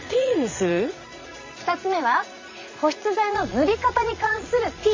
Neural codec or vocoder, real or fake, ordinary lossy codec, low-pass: vocoder, 22.05 kHz, 80 mel bands, Vocos; fake; MP3, 32 kbps; 7.2 kHz